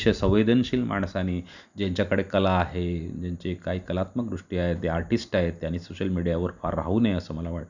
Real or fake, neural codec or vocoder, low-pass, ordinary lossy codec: real; none; 7.2 kHz; none